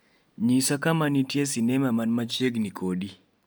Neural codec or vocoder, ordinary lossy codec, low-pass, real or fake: none; none; none; real